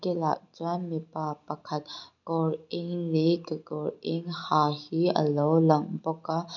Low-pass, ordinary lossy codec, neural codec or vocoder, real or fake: 7.2 kHz; none; none; real